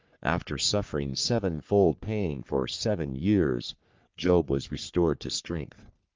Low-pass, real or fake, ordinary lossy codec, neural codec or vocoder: 7.2 kHz; fake; Opus, 32 kbps; codec, 44.1 kHz, 3.4 kbps, Pupu-Codec